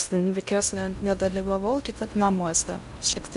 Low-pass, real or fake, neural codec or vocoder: 10.8 kHz; fake; codec, 16 kHz in and 24 kHz out, 0.6 kbps, FocalCodec, streaming, 2048 codes